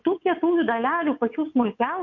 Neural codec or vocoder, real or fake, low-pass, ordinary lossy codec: vocoder, 22.05 kHz, 80 mel bands, WaveNeXt; fake; 7.2 kHz; MP3, 48 kbps